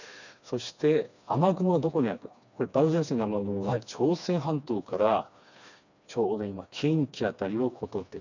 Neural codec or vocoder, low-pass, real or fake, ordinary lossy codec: codec, 16 kHz, 2 kbps, FreqCodec, smaller model; 7.2 kHz; fake; none